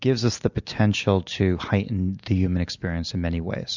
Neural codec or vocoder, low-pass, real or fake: none; 7.2 kHz; real